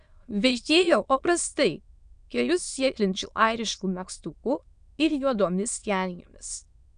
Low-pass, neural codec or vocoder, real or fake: 9.9 kHz; autoencoder, 22.05 kHz, a latent of 192 numbers a frame, VITS, trained on many speakers; fake